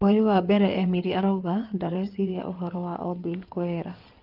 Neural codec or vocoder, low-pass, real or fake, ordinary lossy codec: codec, 16 kHz in and 24 kHz out, 2.2 kbps, FireRedTTS-2 codec; 5.4 kHz; fake; Opus, 24 kbps